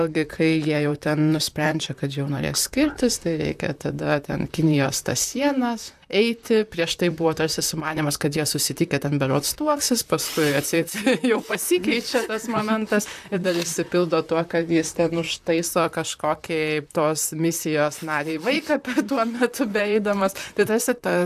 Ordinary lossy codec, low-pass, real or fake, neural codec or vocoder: AAC, 96 kbps; 14.4 kHz; fake; vocoder, 44.1 kHz, 128 mel bands, Pupu-Vocoder